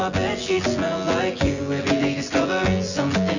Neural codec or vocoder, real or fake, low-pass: vocoder, 24 kHz, 100 mel bands, Vocos; fake; 7.2 kHz